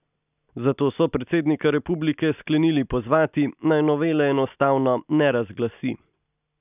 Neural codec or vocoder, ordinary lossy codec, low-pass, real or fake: none; none; 3.6 kHz; real